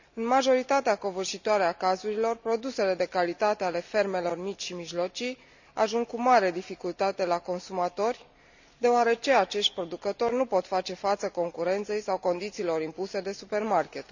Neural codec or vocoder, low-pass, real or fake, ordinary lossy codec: none; 7.2 kHz; real; none